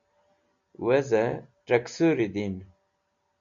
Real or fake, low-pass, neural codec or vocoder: real; 7.2 kHz; none